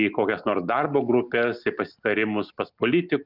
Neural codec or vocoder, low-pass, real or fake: none; 5.4 kHz; real